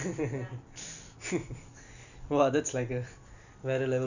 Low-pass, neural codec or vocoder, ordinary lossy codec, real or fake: 7.2 kHz; none; none; real